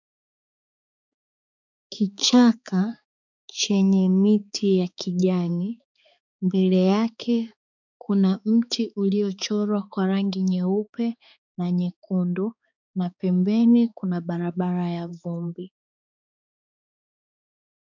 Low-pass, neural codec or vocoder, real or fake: 7.2 kHz; codec, 16 kHz, 4 kbps, X-Codec, HuBERT features, trained on balanced general audio; fake